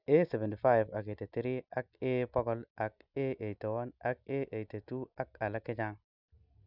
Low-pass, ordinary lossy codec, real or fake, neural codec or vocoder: 5.4 kHz; none; real; none